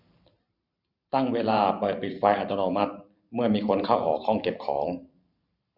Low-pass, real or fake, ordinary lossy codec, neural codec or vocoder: 5.4 kHz; fake; none; vocoder, 44.1 kHz, 128 mel bands every 512 samples, BigVGAN v2